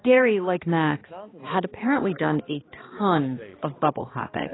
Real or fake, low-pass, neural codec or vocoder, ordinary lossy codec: fake; 7.2 kHz; codec, 16 kHz, 2 kbps, X-Codec, HuBERT features, trained on balanced general audio; AAC, 16 kbps